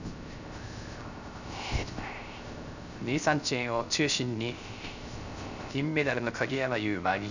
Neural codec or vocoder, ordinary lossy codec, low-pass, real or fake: codec, 16 kHz, 0.3 kbps, FocalCodec; none; 7.2 kHz; fake